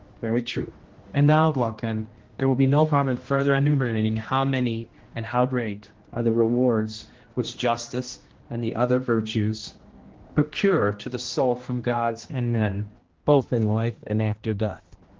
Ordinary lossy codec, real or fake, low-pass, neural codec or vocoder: Opus, 16 kbps; fake; 7.2 kHz; codec, 16 kHz, 1 kbps, X-Codec, HuBERT features, trained on general audio